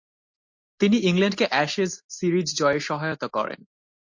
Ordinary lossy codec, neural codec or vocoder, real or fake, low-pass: MP3, 48 kbps; none; real; 7.2 kHz